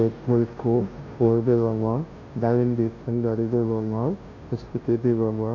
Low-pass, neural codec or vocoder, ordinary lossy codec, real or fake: 7.2 kHz; codec, 16 kHz, 0.5 kbps, FunCodec, trained on Chinese and English, 25 frames a second; AAC, 32 kbps; fake